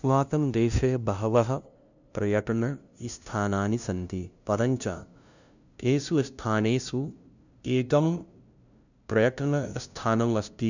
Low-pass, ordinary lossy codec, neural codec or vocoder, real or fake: 7.2 kHz; none; codec, 16 kHz, 0.5 kbps, FunCodec, trained on LibriTTS, 25 frames a second; fake